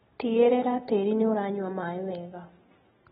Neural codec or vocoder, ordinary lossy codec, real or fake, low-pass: none; AAC, 16 kbps; real; 19.8 kHz